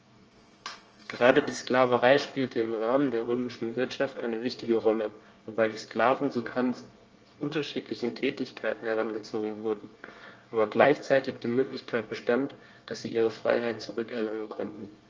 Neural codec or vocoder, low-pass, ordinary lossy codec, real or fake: codec, 24 kHz, 1 kbps, SNAC; 7.2 kHz; Opus, 24 kbps; fake